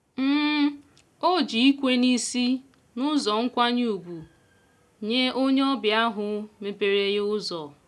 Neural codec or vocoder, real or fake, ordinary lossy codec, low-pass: none; real; none; none